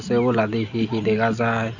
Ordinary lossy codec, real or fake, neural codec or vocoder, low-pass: none; real; none; 7.2 kHz